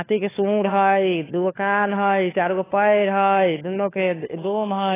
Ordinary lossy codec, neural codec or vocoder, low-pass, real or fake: AAC, 16 kbps; codec, 16 kHz, 4 kbps, FunCodec, trained on LibriTTS, 50 frames a second; 3.6 kHz; fake